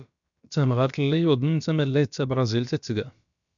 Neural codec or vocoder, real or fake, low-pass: codec, 16 kHz, about 1 kbps, DyCAST, with the encoder's durations; fake; 7.2 kHz